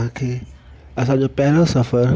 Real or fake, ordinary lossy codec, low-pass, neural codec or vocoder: real; none; none; none